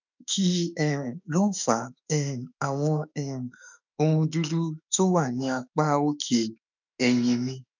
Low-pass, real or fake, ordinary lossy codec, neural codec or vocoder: 7.2 kHz; fake; none; autoencoder, 48 kHz, 32 numbers a frame, DAC-VAE, trained on Japanese speech